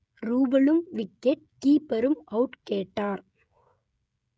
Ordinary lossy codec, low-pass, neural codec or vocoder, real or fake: none; none; codec, 16 kHz, 16 kbps, FreqCodec, smaller model; fake